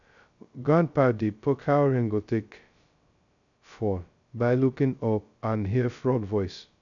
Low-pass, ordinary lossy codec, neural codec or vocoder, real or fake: 7.2 kHz; none; codec, 16 kHz, 0.2 kbps, FocalCodec; fake